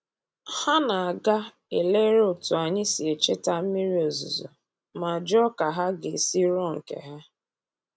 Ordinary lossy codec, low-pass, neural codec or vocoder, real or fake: none; none; none; real